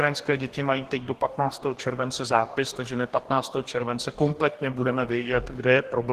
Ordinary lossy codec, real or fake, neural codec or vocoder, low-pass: Opus, 16 kbps; fake; codec, 44.1 kHz, 2.6 kbps, DAC; 14.4 kHz